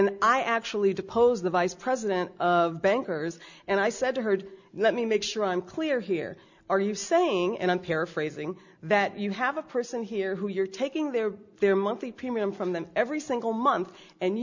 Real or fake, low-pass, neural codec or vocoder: real; 7.2 kHz; none